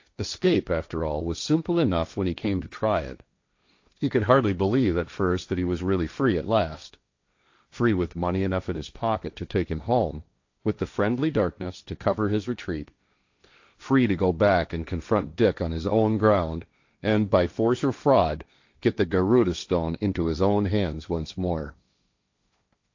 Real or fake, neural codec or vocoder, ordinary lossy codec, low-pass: fake; codec, 16 kHz, 1.1 kbps, Voila-Tokenizer; AAC, 48 kbps; 7.2 kHz